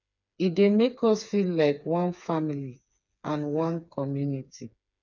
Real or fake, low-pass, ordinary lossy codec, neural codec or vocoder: fake; 7.2 kHz; none; codec, 16 kHz, 4 kbps, FreqCodec, smaller model